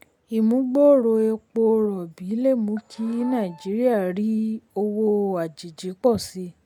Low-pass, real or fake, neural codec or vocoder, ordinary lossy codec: 19.8 kHz; real; none; none